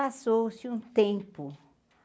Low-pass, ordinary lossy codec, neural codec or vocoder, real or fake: none; none; none; real